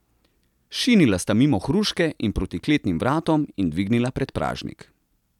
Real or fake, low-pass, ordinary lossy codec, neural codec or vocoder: real; 19.8 kHz; none; none